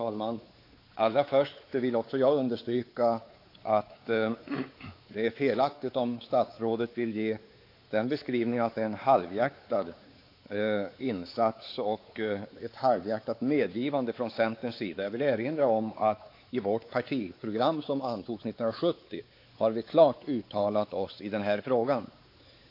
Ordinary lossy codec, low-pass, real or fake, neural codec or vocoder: AAC, 32 kbps; 5.4 kHz; fake; codec, 16 kHz, 4 kbps, X-Codec, WavLM features, trained on Multilingual LibriSpeech